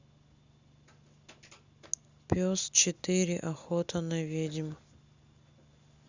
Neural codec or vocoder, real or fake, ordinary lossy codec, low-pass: none; real; Opus, 64 kbps; 7.2 kHz